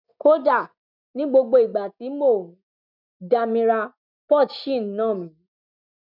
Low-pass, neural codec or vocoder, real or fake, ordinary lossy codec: 5.4 kHz; none; real; none